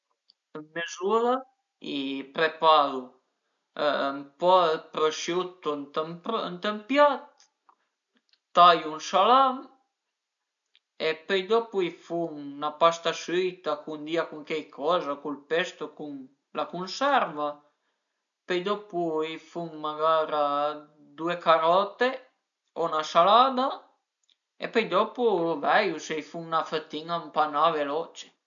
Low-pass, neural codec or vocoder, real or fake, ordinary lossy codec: 7.2 kHz; none; real; none